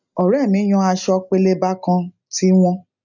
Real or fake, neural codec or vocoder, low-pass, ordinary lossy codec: real; none; 7.2 kHz; none